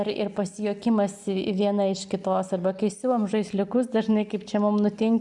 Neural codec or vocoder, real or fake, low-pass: none; real; 10.8 kHz